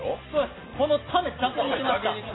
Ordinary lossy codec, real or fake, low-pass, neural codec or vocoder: AAC, 16 kbps; real; 7.2 kHz; none